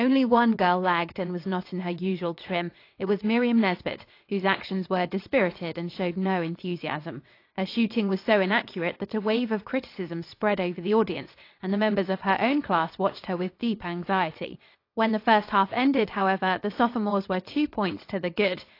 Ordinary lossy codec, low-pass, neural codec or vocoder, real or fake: AAC, 32 kbps; 5.4 kHz; vocoder, 22.05 kHz, 80 mel bands, WaveNeXt; fake